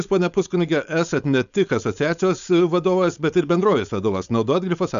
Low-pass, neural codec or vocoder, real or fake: 7.2 kHz; codec, 16 kHz, 4.8 kbps, FACodec; fake